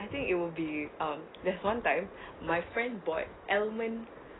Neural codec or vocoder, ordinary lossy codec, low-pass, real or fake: none; AAC, 16 kbps; 7.2 kHz; real